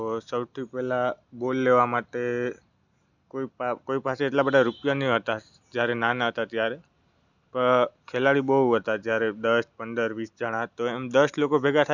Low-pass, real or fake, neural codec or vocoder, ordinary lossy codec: 7.2 kHz; real; none; none